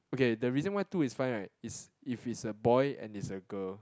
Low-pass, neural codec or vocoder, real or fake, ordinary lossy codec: none; none; real; none